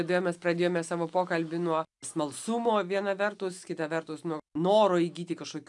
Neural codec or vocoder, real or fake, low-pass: none; real; 10.8 kHz